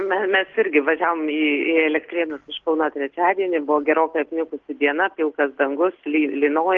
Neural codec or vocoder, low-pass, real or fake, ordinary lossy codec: none; 7.2 kHz; real; Opus, 16 kbps